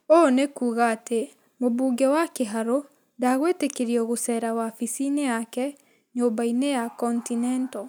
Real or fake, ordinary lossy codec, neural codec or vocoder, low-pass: real; none; none; none